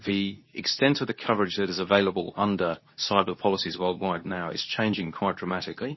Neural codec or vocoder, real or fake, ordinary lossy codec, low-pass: codec, 24 kHz, 0.9 kbps, WavTokenizer, medium speech release version 2; fake; MP3, 24 kbps; 7.2 kHz